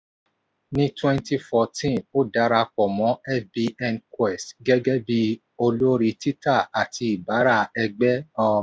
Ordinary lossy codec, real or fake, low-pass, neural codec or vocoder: none; real; none; none